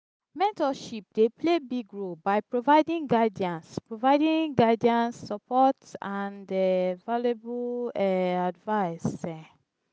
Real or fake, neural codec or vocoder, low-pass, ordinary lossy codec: real; none; none; none